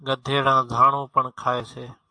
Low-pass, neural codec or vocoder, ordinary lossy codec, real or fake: 9.9 kHz; none; AAC, 64 kbps; real